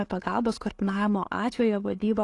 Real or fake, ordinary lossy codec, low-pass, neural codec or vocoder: real; AAC, 48 kbps; 10.8 kHz; none